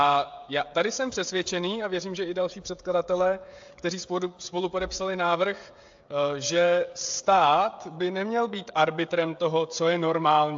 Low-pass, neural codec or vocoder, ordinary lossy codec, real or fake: 7.2 kHz; codec, 16 kHz, 16 kbps, FreqCodec, smaller model; AAC, 64 kbps; fake